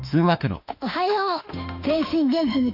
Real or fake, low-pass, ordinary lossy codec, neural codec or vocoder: fake; 5.4 kHz; none; autoencoder, 48 kHz, 32 numbers a frame, DAC-VAE, trained on Japanese speech